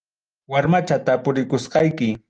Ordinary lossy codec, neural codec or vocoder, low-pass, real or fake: Opus, 24 kbps; none; 9.9 kHz; real